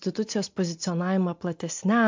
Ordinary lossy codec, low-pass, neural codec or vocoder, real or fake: MP3, 48 kbps; 7.2 kHz; none; real